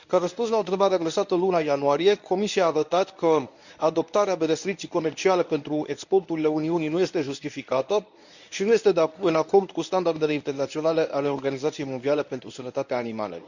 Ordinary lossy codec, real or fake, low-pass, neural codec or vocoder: none; fake; 7.2 kHz; codec, 24 kHz, 0.9 kbps, WavTokenizer, medium speech release version 1